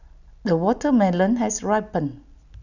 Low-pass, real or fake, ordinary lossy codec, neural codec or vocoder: 7.2 kHz; real; none; none